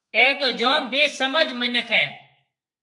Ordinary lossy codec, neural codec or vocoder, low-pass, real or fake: AAC, 64 kbps; codec, 32 kHz, 1.9 kbps, SNAC; 10.8 kHz; fake